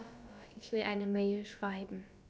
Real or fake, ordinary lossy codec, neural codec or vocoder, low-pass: fake; none; codec, 16 kHz, about 1 kbps, DyCAST, with the encoder's durations; none